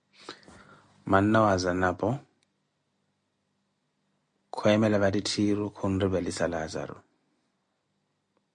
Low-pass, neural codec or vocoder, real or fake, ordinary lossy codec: 9.9 kHz; none; real; MP3, 48 kbps